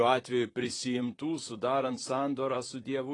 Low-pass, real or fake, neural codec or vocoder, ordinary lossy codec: 10.8 kHz; fake; vocoder, 44.1 kHz, 128 mel bands, Pupu-Vocoder; AAC, 32 kbps